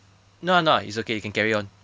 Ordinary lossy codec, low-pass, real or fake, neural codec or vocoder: none; none; real; none